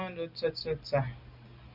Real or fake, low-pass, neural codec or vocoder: real; 5.4 kHz; none